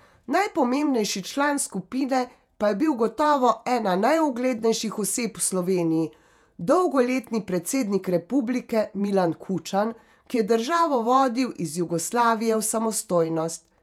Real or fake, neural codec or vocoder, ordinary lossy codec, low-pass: fake; vocoder, 48 kHz, 128 mel bands, Vocos; none; 19.8 kHz